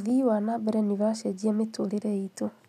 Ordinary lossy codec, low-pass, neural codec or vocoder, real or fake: none; 14.4 kHz; none; real